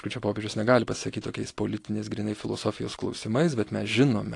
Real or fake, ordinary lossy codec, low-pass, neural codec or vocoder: real; AAC, 48 kbps; 10.8 kHz; none